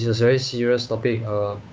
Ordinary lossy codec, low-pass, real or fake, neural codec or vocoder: none; none; fake; codec, 16 kHz, 8 kbps, FunCodec, trained on Chinese and English, 25 frames a second